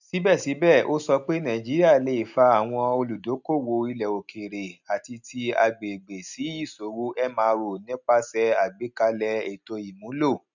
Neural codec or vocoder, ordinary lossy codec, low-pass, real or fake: none; none; 7.2 kHz; real